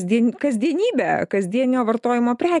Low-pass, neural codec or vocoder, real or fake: 10.8 kHz; none; real